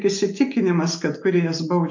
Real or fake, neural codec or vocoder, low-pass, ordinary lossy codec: real; none; 7.2 kHz; MP3, 48 kbps